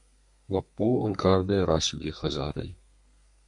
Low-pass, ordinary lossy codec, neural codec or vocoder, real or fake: 10.8 kHz; MP3, 64 kbps; codec, 32 kHz, 1.9 kbps, SNAC; fake